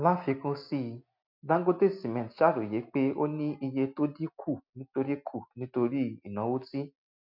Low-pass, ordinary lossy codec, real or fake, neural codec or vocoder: 5.4 kHz; MP3, 48 kbps; real; none